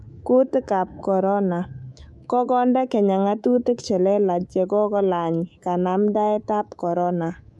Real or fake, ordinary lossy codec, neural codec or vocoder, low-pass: fake; none; codec, 24 kHz, 3.1 kbps, DualCodec; none